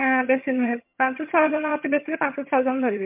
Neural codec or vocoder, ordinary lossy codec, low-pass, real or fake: vocoder, 22.05 kHz, 80 mel bands, HiFi-GAN; MP3, 32 kbps; 3.6 kHz; fake